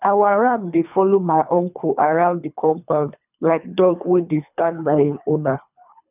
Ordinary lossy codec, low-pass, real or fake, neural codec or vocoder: none; 3.6 kHz; fake; codec, 24 kHz, 3 kbps, HILCodec